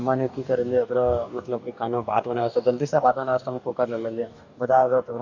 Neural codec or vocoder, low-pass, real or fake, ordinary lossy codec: codec, 44.1 kHz, 2.6 kbps, DAC; 7.2 kHz; fake; none